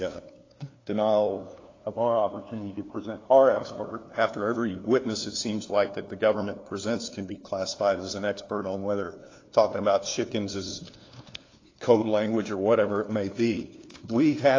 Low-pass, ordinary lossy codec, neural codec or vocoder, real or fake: 7.2 kHz; AAC, 32 kbps; codec, 16 kHz, 2 kbps, FunCodec, trained on LibriTTS, 25 frames a second; fake